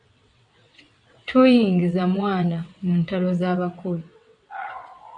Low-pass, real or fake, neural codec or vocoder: 9.9 kHz; fake; vocoder, 22.05 kHz, 80 mel bands, WaveNeXt